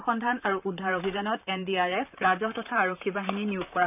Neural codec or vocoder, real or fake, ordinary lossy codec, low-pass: vocoder, 44.1 kHz, 128 mel bands, Pupu-Vocoder; fake; none; 3.6 kHz